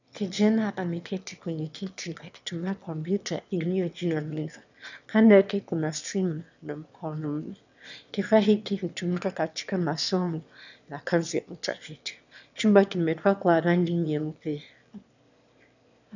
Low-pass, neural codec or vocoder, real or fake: 7.2 kHz; autoencoder, 22.05 kHz, a latent of 192 numbers a frame, VITS, trained on one speaker; fake